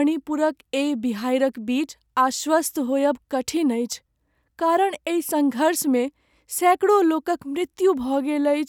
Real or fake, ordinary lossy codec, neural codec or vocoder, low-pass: real; none; none; 19.8 kHz